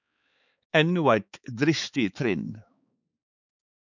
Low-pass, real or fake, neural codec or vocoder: 7.2 kHz; fake; codec, 16 kHz, 4 kbps, X-Codec, HuBERT features, trained on balanced general audio